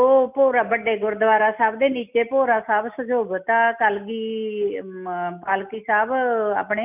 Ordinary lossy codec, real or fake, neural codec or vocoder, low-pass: none; real; none; 3.6 kHz